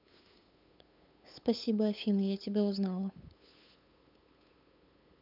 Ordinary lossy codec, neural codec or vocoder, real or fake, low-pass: none; codec, 16 kHz, 8 kbps, FunCodec, trained on LibriTTS, 25 frames a second; fake; 5.4 kHz